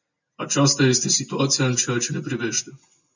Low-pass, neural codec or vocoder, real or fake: 7.2 kHz; none; real